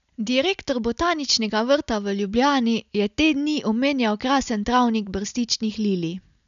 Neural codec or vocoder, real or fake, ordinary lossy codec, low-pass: none; real; none; 7.2 kHz